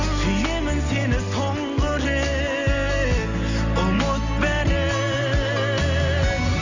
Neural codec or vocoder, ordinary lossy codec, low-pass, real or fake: none; none; 7.2 kHz; real